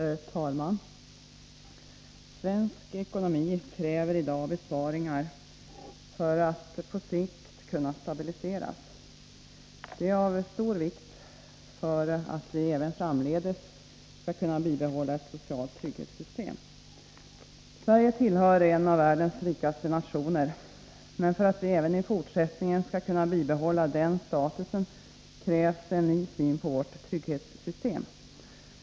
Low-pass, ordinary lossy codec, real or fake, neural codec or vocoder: none; none; real; none